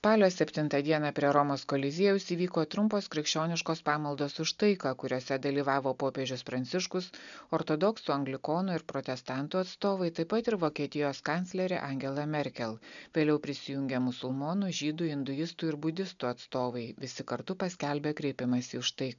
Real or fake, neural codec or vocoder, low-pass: real; none; 7.2 kHz